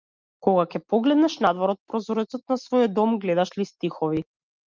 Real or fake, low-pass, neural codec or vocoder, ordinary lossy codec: real; 7.2 kHz; none; Opus, 24 kbps